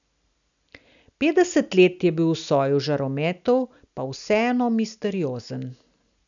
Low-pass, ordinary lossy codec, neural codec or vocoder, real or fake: 7.2 kHz; none; none; real